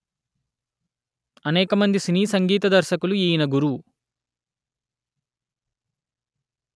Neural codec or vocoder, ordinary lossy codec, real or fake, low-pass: none; none; real; none